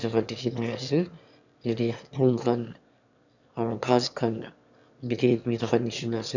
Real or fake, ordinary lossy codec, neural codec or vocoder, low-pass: fake; none; autoencoder, 22.05 kHz, a latent of 192 numbers a frame, VITS, trained on one speaker; 7.2 kHz